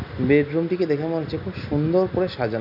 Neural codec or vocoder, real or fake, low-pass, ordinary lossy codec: none; real; 5.4 kHz; none